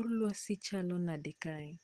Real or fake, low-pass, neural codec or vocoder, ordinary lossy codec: real; 10.8 kHz; none; Opus, 16 kbps